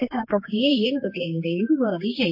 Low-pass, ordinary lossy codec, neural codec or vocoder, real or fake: 5.4 kHz; MP3, 32 kbps; codec, 16 kHz, 2 kbps, X-Codec, HuBERT features, trained on general audio; fake